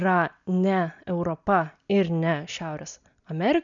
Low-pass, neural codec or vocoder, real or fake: 7.2 kHz; none; real